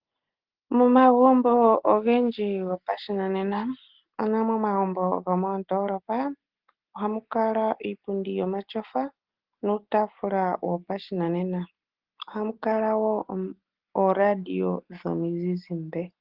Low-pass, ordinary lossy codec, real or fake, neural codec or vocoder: 5.4 kHz; Opus, 16 kbps; real; none